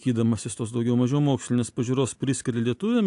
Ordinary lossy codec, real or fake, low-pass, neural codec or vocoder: AAC, 64 kbps; real; 10.8 kHz; none